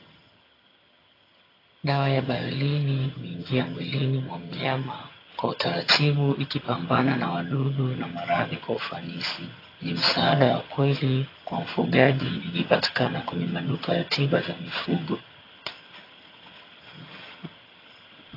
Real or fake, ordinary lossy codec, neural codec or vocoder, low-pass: fake; AAC, 24 kbps; vocoder, 22.05 kHz, 80 mel bands, HiFi-GAN; 5.4 kHz